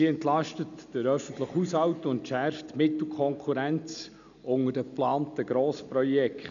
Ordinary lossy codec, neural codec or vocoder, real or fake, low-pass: none; none; real; 7.2 kHz